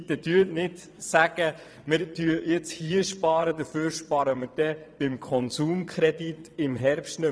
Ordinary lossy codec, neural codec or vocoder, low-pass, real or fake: none; vocoder, 22.05 kHz, 80 mel bands, WaveNeXt; none; fake